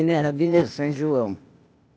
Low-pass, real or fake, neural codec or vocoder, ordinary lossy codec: none; fake; codec, 16 kHz, 0.8 kbps, ZipCodec; none